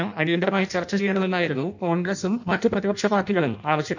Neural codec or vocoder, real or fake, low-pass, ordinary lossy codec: codec, 16 kHz in and 24 kHz out, 0.6 kbps, FireRedTTS-2 codec; fake; 7.2 kHz; none